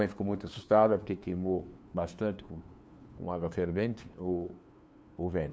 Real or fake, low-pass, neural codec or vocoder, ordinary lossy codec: fake; none; codec, 16 kHz, 2 kbps, FunCodec, trained on LibriTTS, 25 frames a second; none